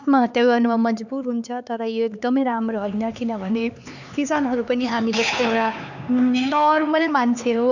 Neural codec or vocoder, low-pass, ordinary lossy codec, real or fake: codec, 16 kHz, 4 kbps, X-Codec, HuBERT features, trained on LibriSpeech; 7.2 kHz; none; fake